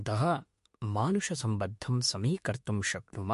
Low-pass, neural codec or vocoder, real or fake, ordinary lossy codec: 14.4 kHz; autoencoder, 48 kHz, 32 numbers a frame, DAC-VAE, trained on Japanese speech; fake; MP3, 48 kbps